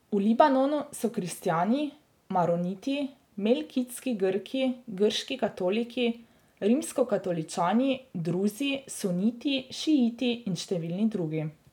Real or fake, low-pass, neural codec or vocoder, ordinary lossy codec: real; 19.8 kHz; none; none